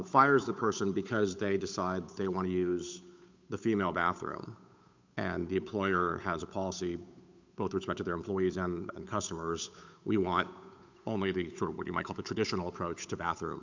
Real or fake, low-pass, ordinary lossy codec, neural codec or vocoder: fake; 7.2 kHz; MP3, 64 kbps; codec, 16 kHz, 8 kbps, FunCodec, trained on Chinese and English, 25 frames a second